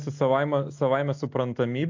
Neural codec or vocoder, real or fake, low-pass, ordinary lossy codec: none; real; 7.2 kHz; AAC, 48 kbps